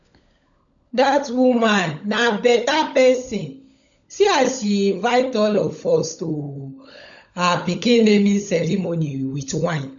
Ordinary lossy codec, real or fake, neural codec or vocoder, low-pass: none; fake; codec, 16 kHz, 16 kbps, FunCodec, trained on LibriTTS, 50 frames a second; 7.2 kHz